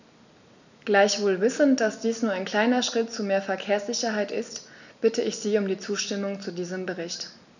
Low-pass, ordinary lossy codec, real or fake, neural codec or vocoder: 7.2 kHz; none; real; none